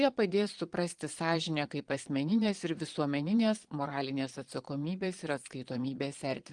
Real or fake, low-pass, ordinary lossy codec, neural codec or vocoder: fake; 9.9 kHz; Opus, 24 kbps; vocoder, 22.05 kHz, 80 mel bands, Vocos